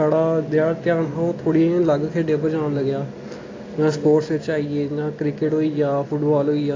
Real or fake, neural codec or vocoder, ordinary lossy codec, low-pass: real; none; AAC, 32 kbps; 7.2 kHz